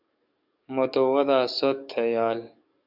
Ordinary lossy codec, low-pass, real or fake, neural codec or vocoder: Opus, 64 kbps; 5.4 kHz; fake; codec, 44.1 kHz, 7.8 kbps, DAC